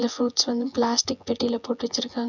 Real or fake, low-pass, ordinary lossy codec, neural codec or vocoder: fake; 7.2 kHz; none; vocoder, 24 kHz, 100 mel bands, Vocos